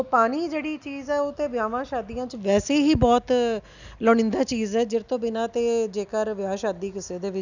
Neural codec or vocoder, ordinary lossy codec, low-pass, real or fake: none; none; 7.2 kHz; real